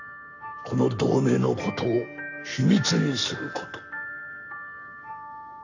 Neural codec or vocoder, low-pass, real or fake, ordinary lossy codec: codec, 44.1 kHz, 7.8 kbps, Pupu-Codec; 7.2 kHz; fake; none